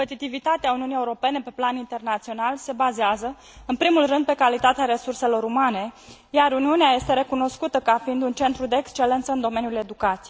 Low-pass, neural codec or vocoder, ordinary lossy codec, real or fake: none; none; none; real